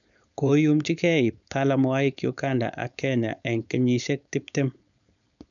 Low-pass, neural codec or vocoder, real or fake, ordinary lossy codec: 7.2 kHz; codec, 16 kHz, 4.8 kbps, FACodec; fake; none